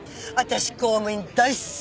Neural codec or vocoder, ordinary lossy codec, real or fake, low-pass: none; none; real; none